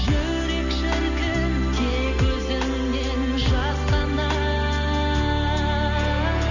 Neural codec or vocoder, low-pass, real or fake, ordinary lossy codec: none; 7.2 kHz; real; none